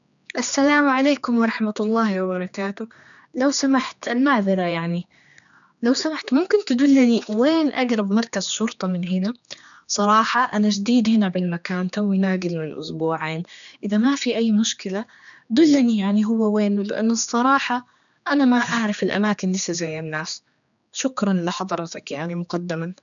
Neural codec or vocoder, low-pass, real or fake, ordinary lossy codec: codec, 16 kHz, 2 kbps, X-Codec, HuBERT features, trained on general audio; 7.2 kHz; fake; none